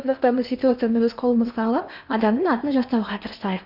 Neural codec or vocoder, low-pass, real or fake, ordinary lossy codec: codec, 16 kHz in and 24 kHz out, 0.8 kbps, FocalCodec, streaming, 65536 codes; 5.4 kHz; fake; none